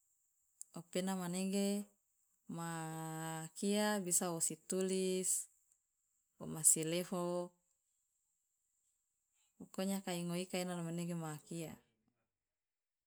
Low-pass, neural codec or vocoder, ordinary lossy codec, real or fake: none; none; none; real